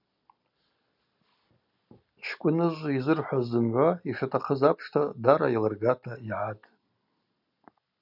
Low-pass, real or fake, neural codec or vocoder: 5.4 kHz; real; none